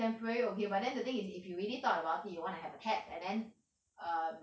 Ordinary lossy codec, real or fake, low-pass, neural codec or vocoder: none; real; none; none